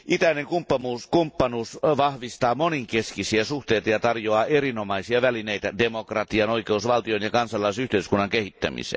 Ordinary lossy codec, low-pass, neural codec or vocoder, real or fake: none; none; none; real